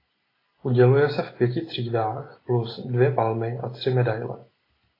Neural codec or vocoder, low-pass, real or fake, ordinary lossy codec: none; 5.4 kHz; real; AAC, 24 kbps